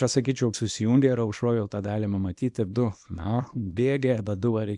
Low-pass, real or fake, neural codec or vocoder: 10.8 kHz; fake; codec, 24 kHz, 0.9 kbps, WavTokenizer, small release